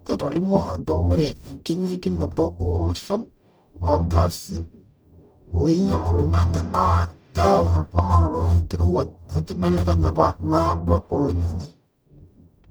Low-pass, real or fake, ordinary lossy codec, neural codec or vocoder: none; fake; none; codec, 44.1 kHz, 0.9 kbps, DAC